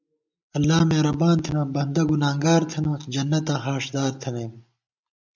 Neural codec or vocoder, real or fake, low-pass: none; real; 7.2 kHz